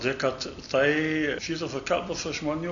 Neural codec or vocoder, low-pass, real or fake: none; 7.2 kHz; real